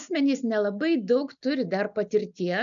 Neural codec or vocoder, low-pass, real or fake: none; 7.2 kHz; real